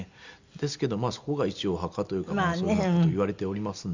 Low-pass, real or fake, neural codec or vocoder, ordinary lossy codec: 7.2 kHz; real; none; Opus, 64 kbps